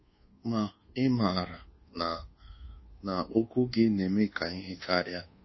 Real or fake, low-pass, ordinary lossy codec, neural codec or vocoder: fake; 7.2 kHz; MP3, 24 kbps; codec, 24 kHz, 1.2 kbps, DualCodec